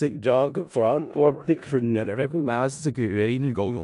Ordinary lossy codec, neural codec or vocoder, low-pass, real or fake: AAC, 96 kbps; codec, 16 kHz in and 24 kHz out, 0.4 kbps, LongCat-Audio-Codec, four codebook decoder; 10.8 kHz; fake